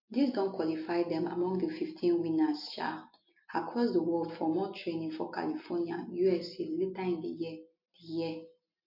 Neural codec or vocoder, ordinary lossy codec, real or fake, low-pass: none; MP3, 32 kbps; real; 5.4 kHz